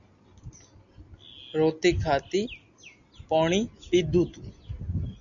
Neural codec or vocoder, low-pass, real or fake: none; 7.2 kHz; real